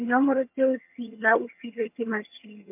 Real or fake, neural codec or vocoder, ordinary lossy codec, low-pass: fake; vocoder, 22.05 kHz, 80 mel bands, HiFi-GAN; MP3, 32 kbps; 3.6 kHz